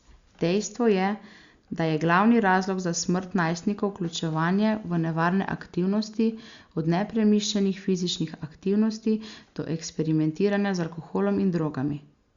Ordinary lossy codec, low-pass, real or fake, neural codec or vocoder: Opus, 64 kbps; 7.2 kHz; real; none